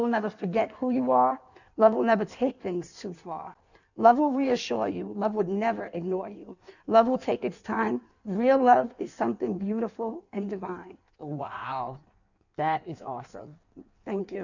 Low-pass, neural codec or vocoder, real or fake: 7.2 kHz; codec, 16 kHz in and 24 kHz out, 1.1 kbps, FireRedTTS-2 codec; fake